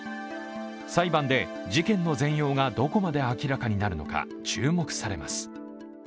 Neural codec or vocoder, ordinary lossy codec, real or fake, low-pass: none; none; real; none